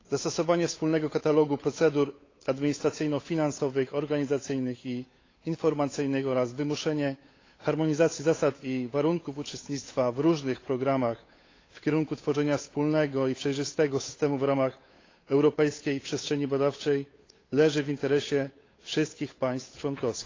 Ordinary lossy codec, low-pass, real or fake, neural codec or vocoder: AAC, 32 kbps; 7.2 kHz; fake; codec, 16 kHz, 8 kbps, FunCodec, trained on Chinese and English, 25 frames a second